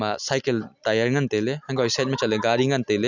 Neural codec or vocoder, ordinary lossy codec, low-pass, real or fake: none; none; 7.2 kHz; real